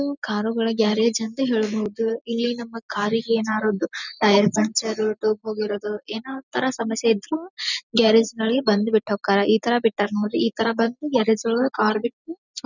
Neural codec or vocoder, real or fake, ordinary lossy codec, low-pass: none; real; none; 7.2 kHz